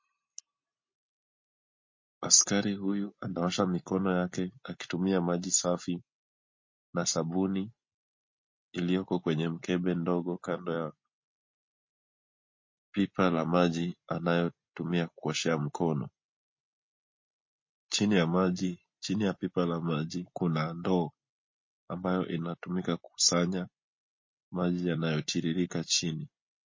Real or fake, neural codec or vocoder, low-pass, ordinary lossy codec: real; none; 7.2 kHz; MP3, 32 kbps